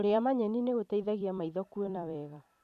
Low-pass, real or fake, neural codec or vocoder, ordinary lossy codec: 14.4 kHz; fake; vocoder, 44.1 kHz, 128 mel bands every 256 samples, BigVGAN v2; none